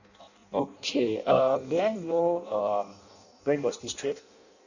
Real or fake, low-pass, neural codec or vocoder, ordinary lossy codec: fake; 7.2 kHz; codec, 16 kHz in and 24 kHz out, 0.6 kbps, FireRedTTS-2 codec; none